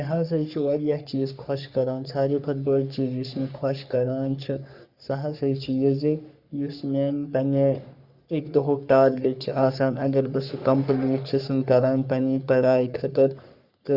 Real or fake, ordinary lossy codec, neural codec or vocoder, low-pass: fake; Opus, 64 kbps; codec, 44.1 kHz, 3.4 kbps, Pupu-Codec; 5.4 kHz